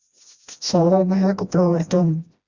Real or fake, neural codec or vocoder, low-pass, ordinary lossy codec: fake; codec, 16 kHz, 1 kbps, FreqCodec, smaller model; 7.2 kHz; Opus, 64 kbps